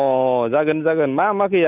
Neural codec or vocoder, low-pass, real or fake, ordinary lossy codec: none; 3.6 kHz; real; none